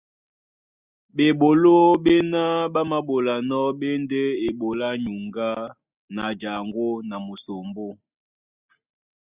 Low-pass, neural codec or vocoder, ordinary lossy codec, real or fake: 3.6 kHz; none; Opus, 64 kbps; real